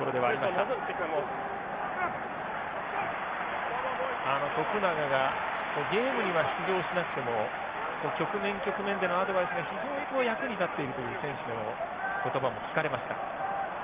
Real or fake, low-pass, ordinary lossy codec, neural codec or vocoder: real; 3.6 kHz; Opus, 32 kbps; none